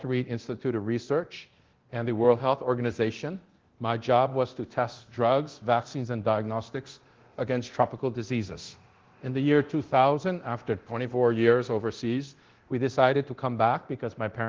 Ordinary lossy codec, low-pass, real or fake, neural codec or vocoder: Opus, 16 kbps; 7.2 kHz; fake; codec, 24 kHz, 0.9 kbps, DualCodec